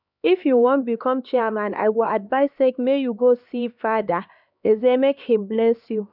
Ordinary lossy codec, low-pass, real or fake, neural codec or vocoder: none; 5.4 kHz; fake; codec, 16 kHz, 2 kbps, X-Codec, HuBERT features, trained on LibriSpeech